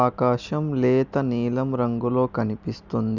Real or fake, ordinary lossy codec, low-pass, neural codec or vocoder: real; none; 7.2 kHz; none